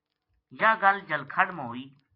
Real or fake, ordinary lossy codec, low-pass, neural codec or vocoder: real; AAC, 32 kbps; 5.4 kHz; none